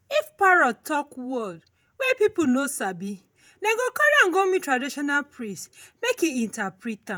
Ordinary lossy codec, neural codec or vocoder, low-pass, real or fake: none; vocoder, 48 kHz, 128 mel bands, Vocos; none; fake